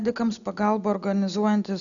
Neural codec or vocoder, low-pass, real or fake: none; 7.2 kHz; real